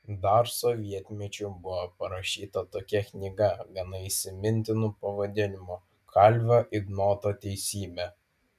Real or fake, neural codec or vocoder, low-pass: real; none; 14.4 kHz